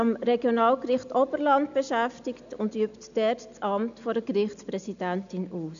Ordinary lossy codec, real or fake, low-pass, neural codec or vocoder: none; real; 7.2 kHz; none